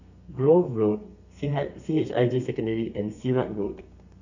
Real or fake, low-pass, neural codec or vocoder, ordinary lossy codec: fake; 7.2 kHz; codec, 32 kHz, 1.9 kbps, SNAC; none